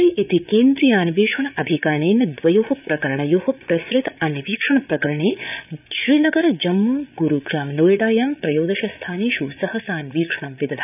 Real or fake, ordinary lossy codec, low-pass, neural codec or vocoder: fake; none; 3.6 kHz; codec, 16 kHz, 8 kbps, FreqCodec, larger model